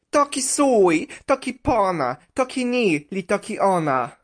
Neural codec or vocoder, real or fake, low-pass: none; real; 9.9 kHz